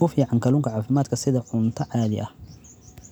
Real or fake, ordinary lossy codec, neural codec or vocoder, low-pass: real; none; none; none